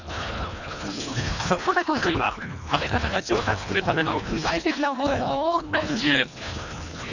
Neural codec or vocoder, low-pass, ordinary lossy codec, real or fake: codec, 24 kHz, 1.5 kbps, HILCodec; 7.2 kHz; none; fake